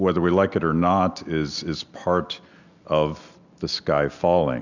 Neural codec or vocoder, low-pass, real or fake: none; 7.2 kHz; real